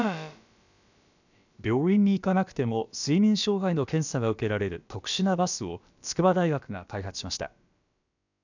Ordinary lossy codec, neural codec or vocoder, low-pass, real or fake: none; codec, 16 kHz, about 1 kbps, DyCAST, with the encoder's durations; 7.2 kHz; fake